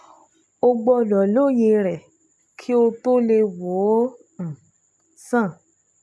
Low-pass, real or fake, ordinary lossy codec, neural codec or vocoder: none; real; none; none